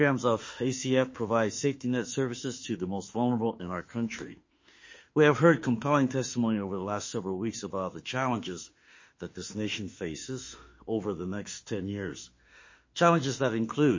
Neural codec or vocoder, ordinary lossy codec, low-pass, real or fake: autoencoder, 48 kHz, 32 numbers a frame, DAC-VAE, trained on Japanese speech; MP3, 32 kbps; 7.2 kHz; fake